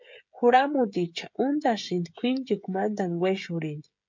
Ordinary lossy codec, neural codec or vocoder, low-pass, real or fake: MP3, 64 kbps; codec, 16 kHz, 16 kbps, FreqCodec, smaller model; 7.2 kHz; fake